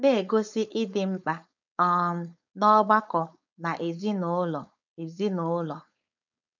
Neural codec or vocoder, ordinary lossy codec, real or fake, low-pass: codec, 16 kHz, 4.8 kbps, FACodec; none; fake; 7.2 kHz